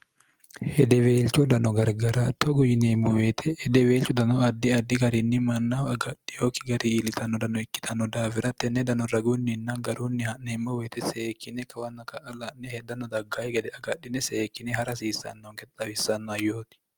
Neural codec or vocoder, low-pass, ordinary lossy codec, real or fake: none; 19.8 kHz; Opus, 32 kbps; real